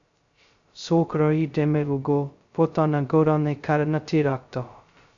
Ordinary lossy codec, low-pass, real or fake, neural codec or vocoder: Opus, 64 kbps; 7.2 kHz; fake; codec, 16 kHz, 0.2 kbps, FocalCodec